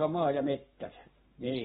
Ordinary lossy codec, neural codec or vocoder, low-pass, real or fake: AAC, 16 kbps; codec, 44.1 kHz, 7.8 kbps, Pupu-Codec; 19.8 kHz; fake